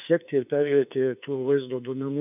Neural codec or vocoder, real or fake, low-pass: autoencoder, 48 kHz, 32 numbers a frame, DAC-VAE, trained on Japanese speech; fake; 3.6 kHz